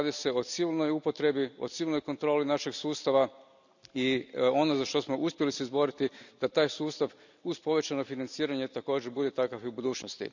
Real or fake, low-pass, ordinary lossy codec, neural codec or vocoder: real; 7.2 kHz; none; none